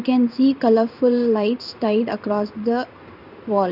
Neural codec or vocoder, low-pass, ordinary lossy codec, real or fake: codec, 16 kHz in and 24 kHz out, 1 kbps, XY-Tokenizer; 5.4 kHz; none; fake